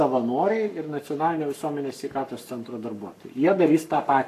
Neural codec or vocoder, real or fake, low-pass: codec, 44.1 kHz, 7.8 kbps, Pupu-Codec; fake; 14.4 kHz